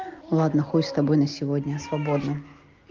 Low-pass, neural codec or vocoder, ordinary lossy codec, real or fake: 7.2 kHz; none; Opus, 32 kbps; real